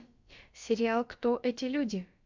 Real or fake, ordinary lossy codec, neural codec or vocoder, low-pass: fake; MP3, 64 kbps; codec, 16 kHz, about 1 kbps, DyCAST, with the encoder's durations; 7.2 kHz